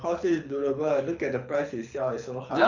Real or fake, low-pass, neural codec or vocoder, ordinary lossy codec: fake; 7.2 kHz; codec, 24 kHz, 6 kbps, HILCodec; none